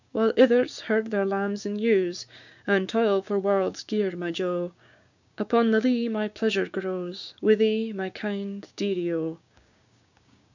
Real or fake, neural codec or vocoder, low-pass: fake; codec, 16 kHz, 6 kbps, DAC; 7.2 kHz